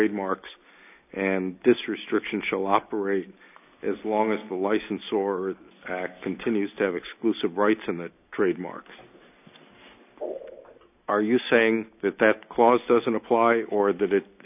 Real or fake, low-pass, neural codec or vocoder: real; 3.6 kHz; none